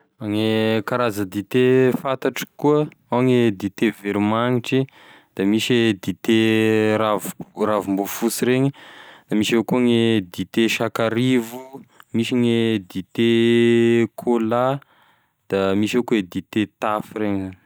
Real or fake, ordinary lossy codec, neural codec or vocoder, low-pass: real; none; none; none